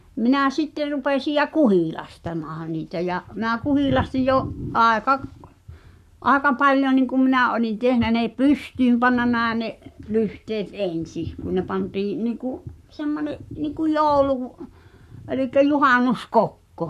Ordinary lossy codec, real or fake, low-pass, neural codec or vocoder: none; fake; 14.4 kHz; codec, 44.1 kHz, 7.8 kbps, Pupu-Codec